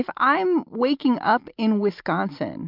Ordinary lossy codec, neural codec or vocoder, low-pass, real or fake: MP3, 48 kbps; none; 5.4 kHz; real